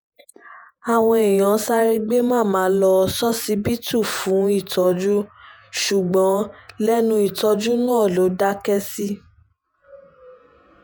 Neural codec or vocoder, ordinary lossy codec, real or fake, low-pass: vocoder, 48 kHz, 128 mel bands, Vocos; none; fake; none